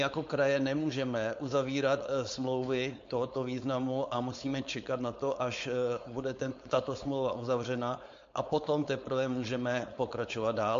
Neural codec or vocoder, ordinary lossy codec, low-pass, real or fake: codec, 16 kHz, 4.8 kbps, FACodec; MP3, 48 kbps; 7.2 kHz; fake